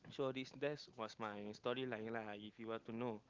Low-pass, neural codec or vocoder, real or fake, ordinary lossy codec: 7.2 kHz; none; real; Opus, 32 kbps